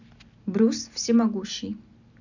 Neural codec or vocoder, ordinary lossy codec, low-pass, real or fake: none; none; 7.2 kHz; real